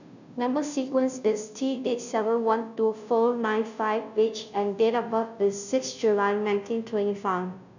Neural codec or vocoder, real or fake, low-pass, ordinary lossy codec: codec, 16 kHz, 0.5 kbps, FunCodec, trained on Chinese and English, 25 frames a second; fake; 7.2 kHz; none